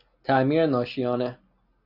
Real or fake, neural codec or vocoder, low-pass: real; none; 5.4 kHz